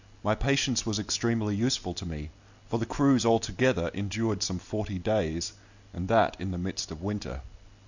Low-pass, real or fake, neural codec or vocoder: 7.2 kHz; real; none